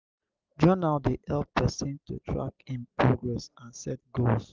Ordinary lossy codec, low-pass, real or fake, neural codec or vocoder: Opus, 32 kbps; 7.2 kHz; real; none